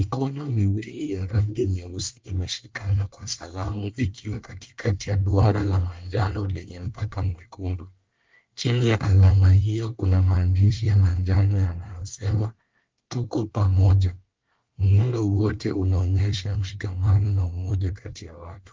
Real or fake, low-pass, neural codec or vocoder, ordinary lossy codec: fake; 7.2 kHz; codec, 24 kHz, 1 kbps, SNAC; Opus, 32 kbps